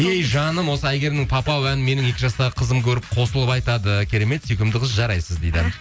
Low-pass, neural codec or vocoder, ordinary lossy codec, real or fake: none; none; none; real